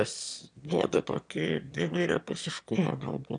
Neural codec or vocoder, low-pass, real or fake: autoencoder, 22.05 kHz, a latent of 192 numbers a frame, VITS, trained on one speaker; 9.9 kHz; fake